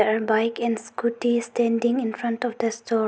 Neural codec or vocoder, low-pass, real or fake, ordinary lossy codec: none; none; real; none